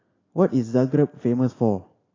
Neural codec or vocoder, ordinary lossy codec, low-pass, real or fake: none; AAC, 32 kbps; 7.2 kHz; real